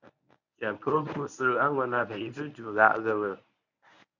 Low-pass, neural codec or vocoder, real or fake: 7.2 kHz; codec, 24 kHz, 0.9 kbps, WavTokenizer, medium speech release version 1; fake